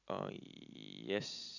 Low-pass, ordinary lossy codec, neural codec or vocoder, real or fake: 7.2 kHz; none; none; real